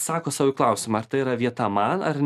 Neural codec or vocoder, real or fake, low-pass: none; real; 14.4 kHz